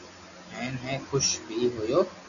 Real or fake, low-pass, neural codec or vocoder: real; 7.2 kHz; none